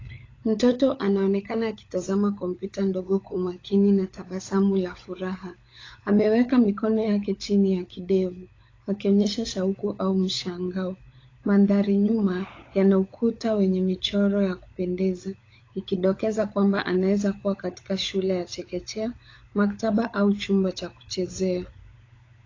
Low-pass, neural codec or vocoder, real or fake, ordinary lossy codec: 7.2 kHz; codec, 16 kHz, 16 kbps, FunCodec, trained on LibriTTS, 50 frames a second; fake; AAC, 32 kbps